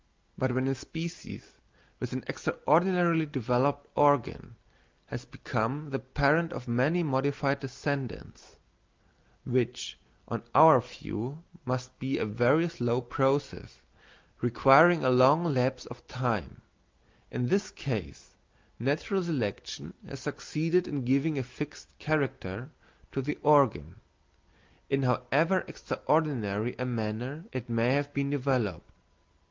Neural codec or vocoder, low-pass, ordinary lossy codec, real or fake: none; 7.2 kHz; Opus, 24 kbps; real